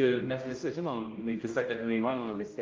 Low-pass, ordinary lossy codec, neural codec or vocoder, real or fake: 7.2 kHz; Opus, 24 kbps; codec, 16 kHz, 0.5 kbps, X-Codec, HuBERT features, trained on general audio; fake